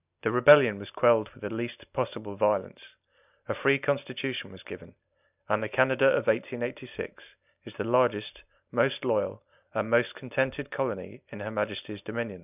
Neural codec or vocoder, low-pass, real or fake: none; 3.6 kHz; real